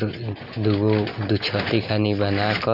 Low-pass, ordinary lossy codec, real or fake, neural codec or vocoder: 5.4 kHz; none; real; none